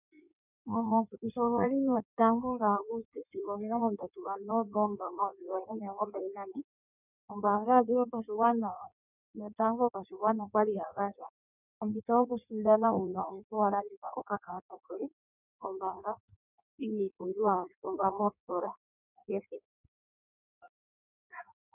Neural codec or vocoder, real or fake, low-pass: codec, 16 kHz in and 24 kHz out, 1.1 kbps, FireRedTTS-2 codec; fake; 3.6 kHz